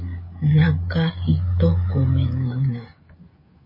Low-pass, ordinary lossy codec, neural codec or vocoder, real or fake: 5.4 kHz; MP3, 24 kbps; codec, 16 kHz, 16 kbps, FreqCodec, smaller model; fake